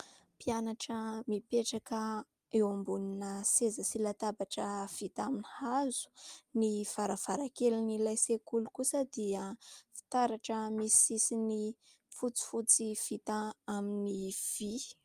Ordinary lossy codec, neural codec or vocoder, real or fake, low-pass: Opus, 32 kbps; none; real; 14.4 kHz